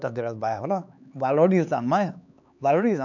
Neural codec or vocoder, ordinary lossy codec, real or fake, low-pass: codec, 16 kHz, 4 kbps, X-Codec, HuBERT features, trained on LibriSpeech; none; fake; 7.2 kHz